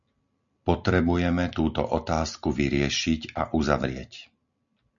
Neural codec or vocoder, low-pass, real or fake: none; 7.2 kHz; real